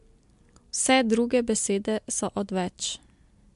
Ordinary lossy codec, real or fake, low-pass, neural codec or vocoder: MP3, 64 kbps; real; 10.8 kHz; none